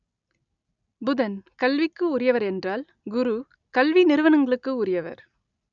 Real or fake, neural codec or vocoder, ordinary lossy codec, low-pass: real; none; none; 7.2 kHz